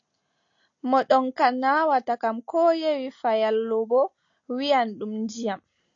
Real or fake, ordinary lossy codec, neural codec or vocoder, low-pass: real; AAC, 48 kbps; none; 7.2 kHz